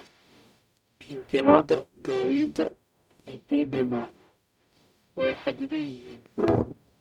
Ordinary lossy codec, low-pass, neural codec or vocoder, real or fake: none; 19.8 kHz; codec, 44.1 kHz, 0.9 kbps, DAC; fake